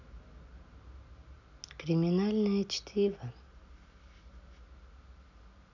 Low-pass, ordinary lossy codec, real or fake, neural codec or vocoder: 7.2 kHz; none; real; none